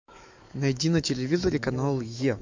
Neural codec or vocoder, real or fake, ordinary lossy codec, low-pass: autoencoder, 48 kHz, 128 numbers a frame, DAC-VAE, trained on Japanese speech; fake; MP3, 48 kbps; 7.2 kHz